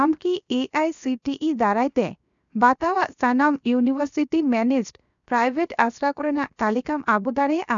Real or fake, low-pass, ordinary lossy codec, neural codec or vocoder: fake; 7.2 kHz; none; codec, 16 kHz, 0.7 kbps, FocalCodec